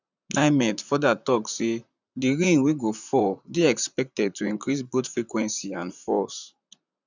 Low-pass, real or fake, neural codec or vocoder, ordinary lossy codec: 7.2 kHz; fake; vocoder, 44.1 kHz, 128 mel bands, Pupu-Vocoder; none